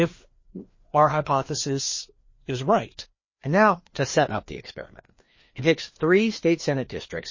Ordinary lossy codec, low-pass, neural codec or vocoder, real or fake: MP3, 32 kbps; 7.2 kHz; codec, 16 kHz, 2 kbps, FreqCodec, larger model; fake